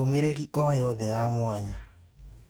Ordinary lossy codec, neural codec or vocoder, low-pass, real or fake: none; codec, 44.1 kHz, 2.6 kbps, DAC; none; fake